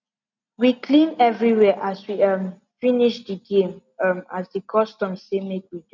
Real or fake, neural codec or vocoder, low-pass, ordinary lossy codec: real; none; 7.2 kHz; none